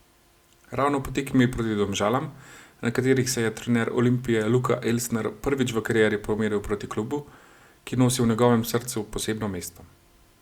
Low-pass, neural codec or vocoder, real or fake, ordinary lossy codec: 19.8 kHz; none; real; Opus, 64 kbps